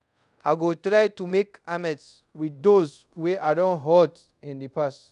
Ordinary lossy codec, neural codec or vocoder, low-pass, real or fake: none; codec, 24 kHz, 0.5 kbps, DualCodec; 10.8 kHz; fake